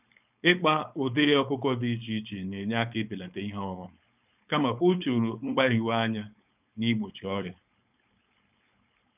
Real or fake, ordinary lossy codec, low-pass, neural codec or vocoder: fake; none; 3.6 kHz; codec, 16 kHz, 4.8 kbps, FACodec